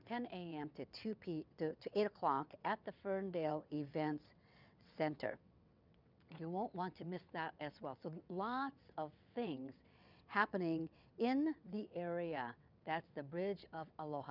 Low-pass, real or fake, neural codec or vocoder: 5.4 kHz; fake; vocoder, 22.05 kHz, 80 mel bands, WaveNeXt